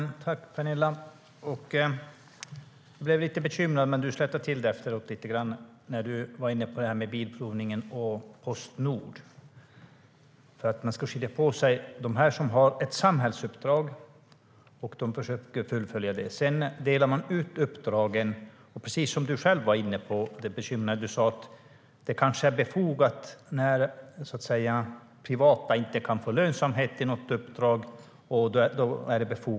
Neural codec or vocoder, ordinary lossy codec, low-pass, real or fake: none; none; none; real